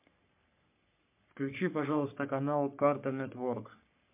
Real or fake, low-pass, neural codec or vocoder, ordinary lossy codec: fake; 3.6 kHz; codec, 44.1 kHz, 3.4 kbps, Pupu-Codec; MP3, 32 kbps